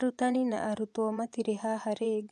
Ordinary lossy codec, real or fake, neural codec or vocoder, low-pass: none; fake; vocoder, 24 kHz, 100 mel bands, Vocos; none